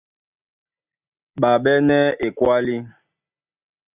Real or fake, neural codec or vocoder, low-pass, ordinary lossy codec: real; none; 3.6 kHz; Opus, 64 kbps